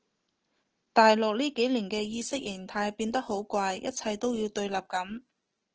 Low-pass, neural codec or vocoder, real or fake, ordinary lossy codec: 7.2 kHz; none; real; Opus, 16 kbps